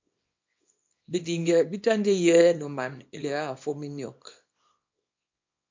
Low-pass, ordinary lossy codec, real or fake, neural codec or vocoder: 7.2 kHz; MP3, 48 kbps; fake; codec, 24 kHz, 0.9 kbps, WavTokenizer, small release